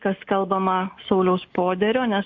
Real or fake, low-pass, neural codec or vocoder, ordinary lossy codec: real; 7.2 kHz; none; MP3, 48 kbps